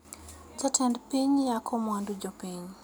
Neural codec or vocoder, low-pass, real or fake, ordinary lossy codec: none; none; real; none